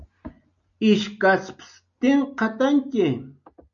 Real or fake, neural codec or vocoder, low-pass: real; none; 7.2 kHz